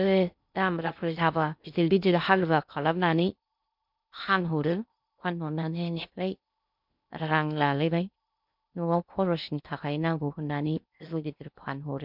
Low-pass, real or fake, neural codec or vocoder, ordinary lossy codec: 5.4 kHz; fake; codec, 16 kHz in and 24 kHz out, 0.6 kbps, FocalCodec, streaming, 2048 codes; MP3, 48 kbps